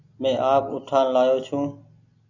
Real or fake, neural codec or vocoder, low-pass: real; none; 7.2 kHz